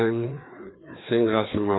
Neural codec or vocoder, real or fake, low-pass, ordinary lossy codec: codec, 16 kHz, 2 kbps, FreqCodec, larger model; fake; 7.2 kHz; AAC, 16 kbps